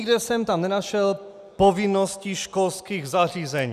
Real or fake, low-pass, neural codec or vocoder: real; 14.4 kHz; none